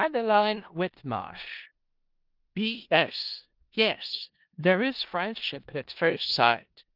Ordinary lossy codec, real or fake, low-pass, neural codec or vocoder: Opus, 32 kbps; fake; 5.4 kHz; codec, 16 kHz in and 24 kHz out, 0.4 kbps, LongCat-Audio-Codec, four codebook decoder